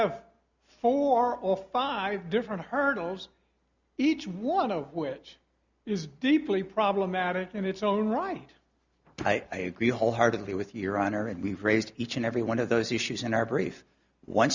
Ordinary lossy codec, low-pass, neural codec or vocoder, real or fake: Opus, 64 kbps; 7.2 kHz; none; real